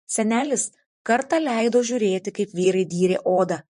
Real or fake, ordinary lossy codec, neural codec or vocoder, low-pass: fake; MP3, 48 kbps; vocoder, 44.1 kHz, 128 mel bands, Pupu-Vocoder; 14.4 kHz